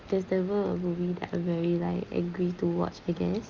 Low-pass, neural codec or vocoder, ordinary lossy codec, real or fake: 7.2 kHz; none; Opus, 24 kbps; real